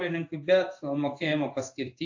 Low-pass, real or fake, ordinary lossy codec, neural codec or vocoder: 7.2 kHz; fake; AAC, 48 kbps; codec, 16 kHz in and 24 kHz out, 1 kbps, XY-Tokenizer